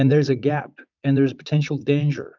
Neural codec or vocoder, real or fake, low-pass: vocoder, 44.1 kHz, 80 mel bands, Vocos; fake; 7.2 kHz